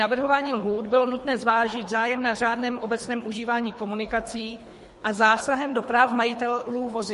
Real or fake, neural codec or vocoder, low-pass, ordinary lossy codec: fake; codec, 24 kHz, 3 kbps, HILCodec; 10.8 kHz; MP3, 48 kbps